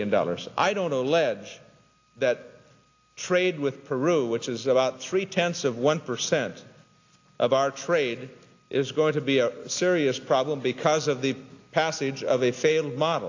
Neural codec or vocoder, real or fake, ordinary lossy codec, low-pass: none; real; AAC, 48 kbps; 7.2 kHz